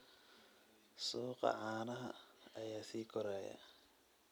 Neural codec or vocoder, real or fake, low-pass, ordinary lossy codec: none; real; none; none